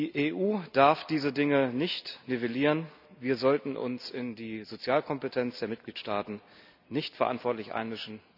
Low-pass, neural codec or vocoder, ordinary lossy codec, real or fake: 5.4 kHz; none; none; real